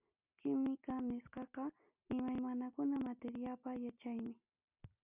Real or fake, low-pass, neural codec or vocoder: real; 3.6 kHz; none